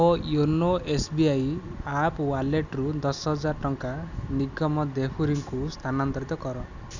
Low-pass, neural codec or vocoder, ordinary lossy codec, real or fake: 7.2 kHz; none; none; real